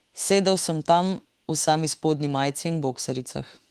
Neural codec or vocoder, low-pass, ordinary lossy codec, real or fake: autoencoder, 48 kHz, 32 numbers a frame, DAC-VAE, trained on Japanese speech; 14.4 kHz; Opus, 24 kbps; fake